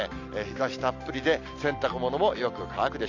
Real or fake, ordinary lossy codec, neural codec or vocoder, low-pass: real; AAC, 48 kbps; none; 7.2 kHz